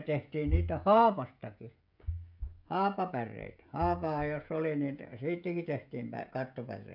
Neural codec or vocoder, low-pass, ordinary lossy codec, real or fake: codec, 16 kHz, 16 kbps, FreqCodec, smaller model; 5.4 kHz; none; fake